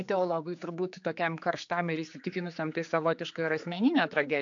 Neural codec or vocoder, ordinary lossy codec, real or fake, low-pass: codec, 16 kHz, 4 kbps, X-Codec, HuBERT features, trained on general audio; AAC, 64 kbps; fake; 7.2 kHz